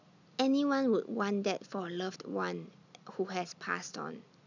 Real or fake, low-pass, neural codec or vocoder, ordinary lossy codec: real; 7.2 kHz; none; none